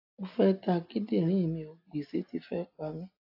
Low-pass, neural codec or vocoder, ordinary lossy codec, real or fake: 5.4 kHz; none; AAC, 32 kbps; real